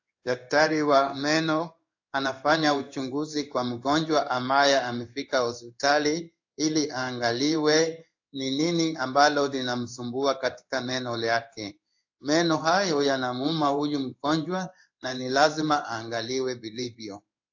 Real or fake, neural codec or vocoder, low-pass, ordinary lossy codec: fake; codec, 16 kHz in and 24 kHz out, 1 kbps, XY-Tokenizer; 7.2 kHz; AAC, 48 kbps